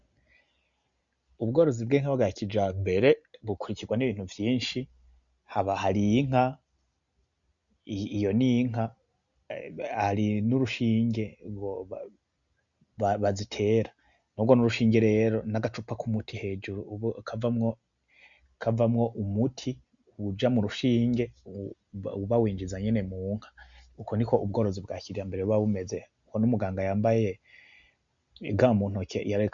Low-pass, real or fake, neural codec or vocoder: 7.2 kHz; real; none